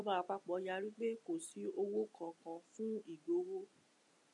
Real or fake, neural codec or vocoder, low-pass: real; none; 10.8 kHz